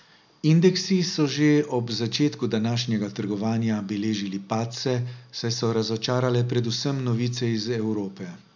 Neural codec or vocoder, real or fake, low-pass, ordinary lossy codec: none; real; 7.2 kHz; none